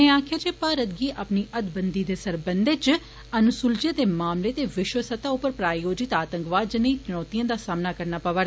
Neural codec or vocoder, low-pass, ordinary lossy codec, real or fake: none; none; none; real